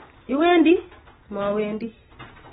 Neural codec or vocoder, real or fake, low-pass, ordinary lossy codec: none; real; 7.2 kHz; AAC, 16 kbps